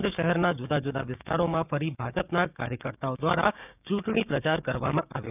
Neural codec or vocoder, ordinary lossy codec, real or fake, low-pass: codec, 16 kHz, 6 kbps, DAC; none; fake; 3.6 kHz